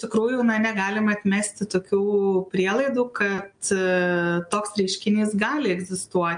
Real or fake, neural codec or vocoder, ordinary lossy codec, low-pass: real; none; MP3, 64 kbps; 9.9 kHz